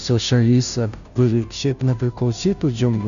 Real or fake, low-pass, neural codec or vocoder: fake; 7.2 kHz; codec, 16 kHz, 0.5 kbps, FunCodec, trained on Chinese and English, 25 frames a second